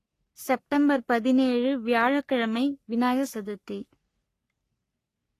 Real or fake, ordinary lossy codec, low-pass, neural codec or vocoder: fake; AAC, 48 kbps; 14.4 kHz; codec, 44.1 kHz, 3.4 kbps, Pupu-Codec